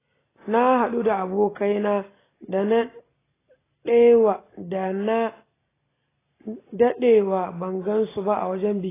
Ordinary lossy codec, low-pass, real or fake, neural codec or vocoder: AAC, 16 kbps; 3.6 kHz; real; none